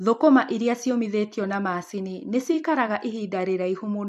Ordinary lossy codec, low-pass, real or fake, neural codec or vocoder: MP3, 64 kbps; 14.4 kHz; fake; vocoder, 44.1 kHz, 128 mel bands every 256 samples, BigVGAN v2